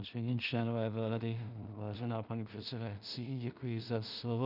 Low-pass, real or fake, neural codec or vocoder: 5.4 kHz; fake; codec, 16 kHz in and 24 kHz out, 0.4 kbps, LongCat-Audio-Codec, two codebook decoder